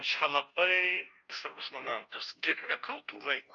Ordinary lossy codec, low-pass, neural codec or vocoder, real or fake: MP3, 96 kbps; 7.2 kHz; codec, 16 kHz, 0.5 kbps, FunCodec, trained on Chinese and English, 25 frames a second; fake